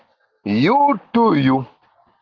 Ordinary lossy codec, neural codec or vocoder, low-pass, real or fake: Opus, 24 kbps; none; 7.2 kHz; real